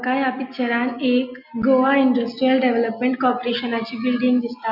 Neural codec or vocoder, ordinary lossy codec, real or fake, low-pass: none; none; real; 5.4 kHz